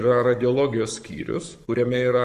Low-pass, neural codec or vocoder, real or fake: 14.4 kHz; codec, 44.1 kHz, 7.8 kbps, Pupu-Codec; fake